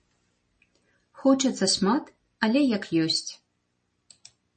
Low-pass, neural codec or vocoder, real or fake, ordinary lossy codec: 10.8 kHz; none; real; MP3, 32 kbps